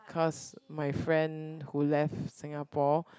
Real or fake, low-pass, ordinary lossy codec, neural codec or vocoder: real; none; none; none